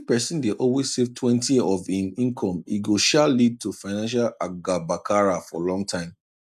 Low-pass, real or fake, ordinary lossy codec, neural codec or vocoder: 14.4 kHz; real; none; none